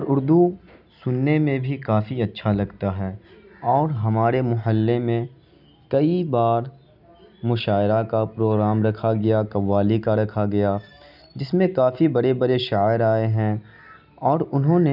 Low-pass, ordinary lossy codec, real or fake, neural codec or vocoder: 5.4 kHz; none; real; none